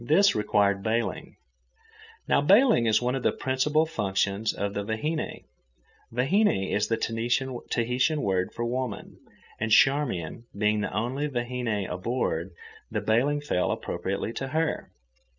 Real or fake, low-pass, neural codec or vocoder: real; 7.2 kHz; none